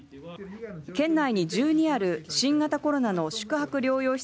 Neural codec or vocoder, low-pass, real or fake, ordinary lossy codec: none; none; real; none